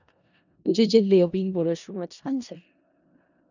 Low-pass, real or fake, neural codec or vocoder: 7.2 kHz; fake; codec, 16 kHz in and 24 kHz out, 0.4 kbps, LongCat-Audio-Codec, four codebook decoder